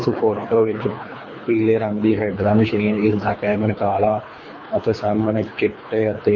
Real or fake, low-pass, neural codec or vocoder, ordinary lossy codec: fake; 7.2 kHz; codec, 24 kHz, 3 kbps, HILCodec; MP3, 32 kbps